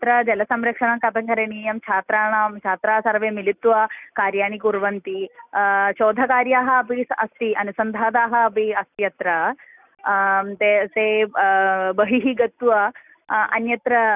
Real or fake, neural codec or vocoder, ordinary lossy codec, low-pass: real; none; none; 3.6 kHz